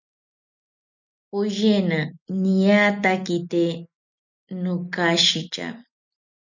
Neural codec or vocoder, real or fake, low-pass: none; real; 7.2 kHz